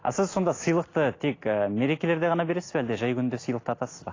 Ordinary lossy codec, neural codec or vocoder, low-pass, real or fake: AAC, 32 kbps; none; 7.2 kHz; real